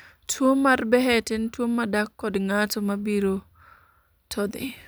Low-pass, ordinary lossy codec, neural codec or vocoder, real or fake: none; none; vocoder, 44.1 kHz, 128 mel bands every 256 samples, BigVGAN v2; fake